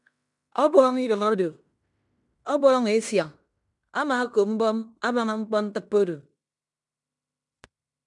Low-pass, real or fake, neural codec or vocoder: 10.8 kHz; fake; codec, 16 kHz in and 24 kHz out, 0.9 kbps, LongCat-Audio-Codec, fine tuned four codebook decoder